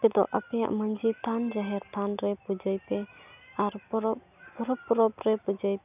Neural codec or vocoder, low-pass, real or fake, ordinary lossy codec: none; 3.6 kHz; real; none